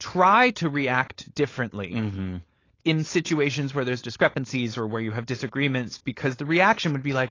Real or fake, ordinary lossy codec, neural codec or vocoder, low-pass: fake; AAC, 32 kbps; codec, 16 kHz, 4.8 kbps, FACodec; 7.2 kHz